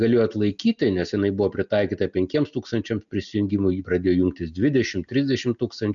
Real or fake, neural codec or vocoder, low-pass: real; none; 7.2 kHz